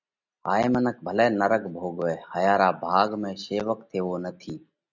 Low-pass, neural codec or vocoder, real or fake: 7.2 kHz; none; real